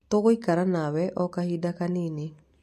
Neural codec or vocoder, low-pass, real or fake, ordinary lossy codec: none; 14.4 kHz; real; MP3, 64 kbps